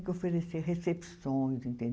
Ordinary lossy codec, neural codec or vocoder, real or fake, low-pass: none; none; real; none